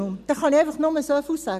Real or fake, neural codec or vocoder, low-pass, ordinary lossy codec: real; none; 14.4 kHz; none